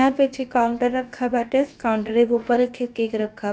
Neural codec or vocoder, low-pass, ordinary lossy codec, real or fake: codec, 16 kHz, about 1 kbps, DyCAST, with the encoder's durations; none; none; fake